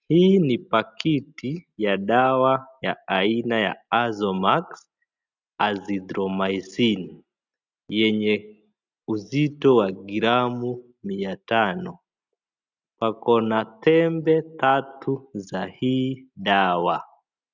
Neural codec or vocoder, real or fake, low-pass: none; real; 7.2 kHz